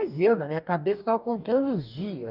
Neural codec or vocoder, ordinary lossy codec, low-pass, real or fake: codec, 44.1 kHz, 2.6 kbps, DAC; none; 5.4 kHz; fake